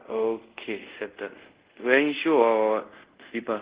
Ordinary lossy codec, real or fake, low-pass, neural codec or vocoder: Opus, 16 kbps; fake; 3.6 kHz; codec, 24 kHz, 0.5 kbps, DualCodec